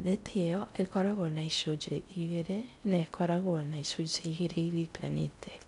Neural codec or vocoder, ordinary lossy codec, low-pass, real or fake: codec, 16 kHz in and 24 kHz out, 0.6 kbps, FocalCodec, streaming, 4096 codes; none; 10.8 kHz; fake